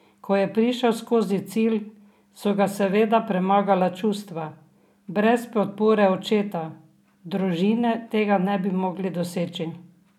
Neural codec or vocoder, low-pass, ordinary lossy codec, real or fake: none; 19.8 kHz; none; real